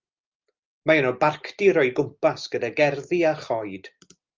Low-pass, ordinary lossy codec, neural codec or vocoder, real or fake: 7.2 kHz; Opus, 32 kbps; none; real